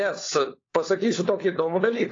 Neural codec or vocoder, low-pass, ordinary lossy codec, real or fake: codec, 16 kHz, 4 kbps, FunCodec, trained on LibriTTS, 50 frames a second; 7.2 kHz; AAC, 32 kbps; fake